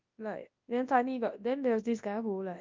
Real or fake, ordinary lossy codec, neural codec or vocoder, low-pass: fake; Opus, 24 kbps; codec, 24 kHz, 0.9 kbps, WavTokenizer, large speech release; 7.2 kHz